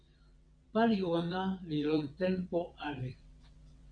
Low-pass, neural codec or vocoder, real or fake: 9.9 kHz; vocoder, 22.05 kHz, 80 mel bands, WaveNeXt; fake